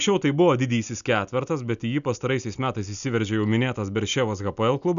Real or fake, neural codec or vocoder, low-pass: real; none; 7.2 kHz